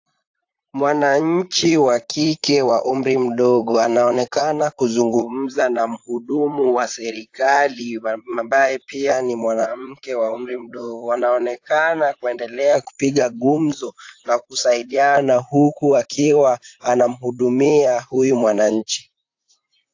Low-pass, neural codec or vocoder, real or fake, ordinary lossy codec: 7.2 kHz; vocoder, 44.1 kHz, 128 mel bands, Pupu-Vocoder; fake; AAC, 48 kbps